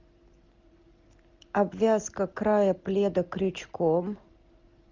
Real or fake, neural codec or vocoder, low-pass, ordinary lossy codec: real; none; 7.2 kHz; Opus, 32 kbps